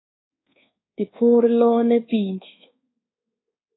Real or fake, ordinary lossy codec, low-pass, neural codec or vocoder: fake; AAC, 16 kbps; 7.2 kHz; codec, 24 kHz, 1.2 kbps, DualCodec